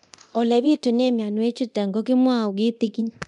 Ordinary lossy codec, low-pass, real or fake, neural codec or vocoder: none; 10.8 kHz; fake; codec, 24 kHz, 0.9 kbps, DualCodec